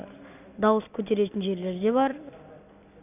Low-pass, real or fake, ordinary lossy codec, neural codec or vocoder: 3.6 kHz; fake; none; vocoder, 44.1 kHz, 128 mel bands every 256 samples, BigVGAN v2